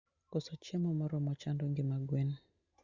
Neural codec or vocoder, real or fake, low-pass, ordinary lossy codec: none; real; 7.2 kHz; Opus, 64 kbps